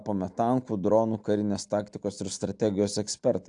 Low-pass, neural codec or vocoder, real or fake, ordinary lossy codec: 9.9 kHz; none; real; AAC, 64 kbps